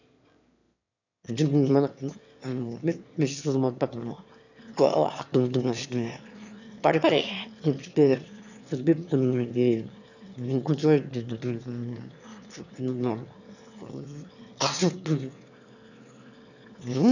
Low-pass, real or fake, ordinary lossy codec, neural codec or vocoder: 7.2 kHz; fake; none; autoencoder, 22.05 kHz, a latent of 192 numbers a frame, VITS, trained on one speaker